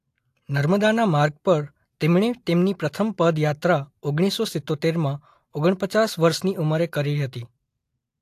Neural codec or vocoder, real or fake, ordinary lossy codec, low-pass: none; real; AAC, 64 kbps; 14.4 kHz